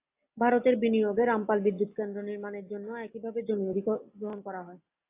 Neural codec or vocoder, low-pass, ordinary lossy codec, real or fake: none; 3.6 kHz; AAC, 24 kbps; real